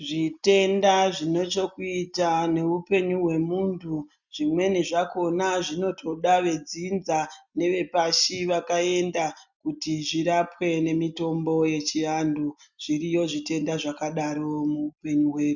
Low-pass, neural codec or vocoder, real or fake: 7.2 kHz; none; real